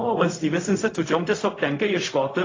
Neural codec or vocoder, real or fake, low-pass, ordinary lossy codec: codec, 16 kHz, 0.4 kbps, LongCat-Audio-Codec; fake; 7.2 kHz; AAC, 32 kbps